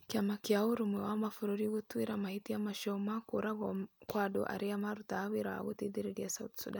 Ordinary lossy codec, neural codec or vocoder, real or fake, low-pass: none; none; real; none